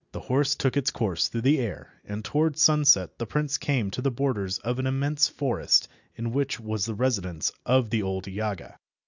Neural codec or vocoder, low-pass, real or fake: none; 7.2 kHz; real